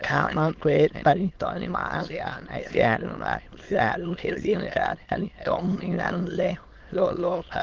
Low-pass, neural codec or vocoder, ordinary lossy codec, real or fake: 7.2 kHz; autoencoder, 22.05 kHz, a latent of 192 numbers a frame, VITS, trained on many speakers; Opus, 16 kbps; fake